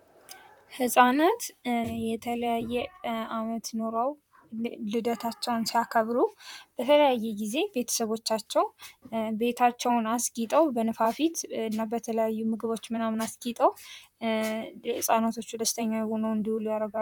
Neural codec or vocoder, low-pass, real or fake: vocoder, 44.1 kHz, 128 mel bands, Pupu-Vocoder; 19.8 kHz; fake